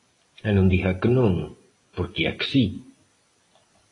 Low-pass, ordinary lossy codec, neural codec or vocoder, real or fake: 10.8 kHz; AAC, 32 kbps; none; real